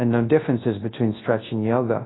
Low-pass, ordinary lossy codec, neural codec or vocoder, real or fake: 7.2 kHz; AAC, 16 kbps; codec, 24 kHz, 0.9 kbps, WavTokenizer, large speech release; fake